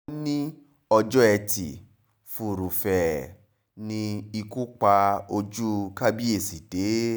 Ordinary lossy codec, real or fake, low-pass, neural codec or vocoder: none; real; none; none